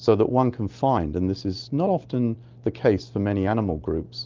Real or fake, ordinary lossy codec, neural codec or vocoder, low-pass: real; Opus, 32 kbps; none; 7.2 kHz